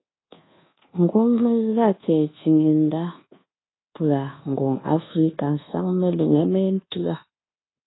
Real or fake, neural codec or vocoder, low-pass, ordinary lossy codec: fake; codec, 24 kHz, 1.2 kbps, DualCodec; 7.2 kHz; AAC, 16 kbps